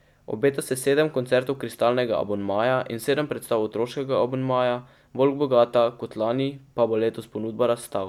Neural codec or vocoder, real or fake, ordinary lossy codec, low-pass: none; real; none; 19.8 kHz